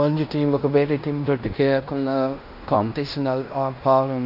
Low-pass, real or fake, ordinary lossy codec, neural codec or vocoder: 5.4 kHz; fake; none; codec, 16 kHz in and 24 kHz out, 0.9 kbps, LongCat-Audio-Codec, fine tuned four codebook decoder